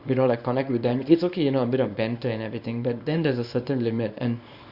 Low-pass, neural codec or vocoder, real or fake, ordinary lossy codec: 5.4 kHz; codec, 24 kHz, 0.9 kbps, WavTokenizer, small release; fake; Opus, 64 kbps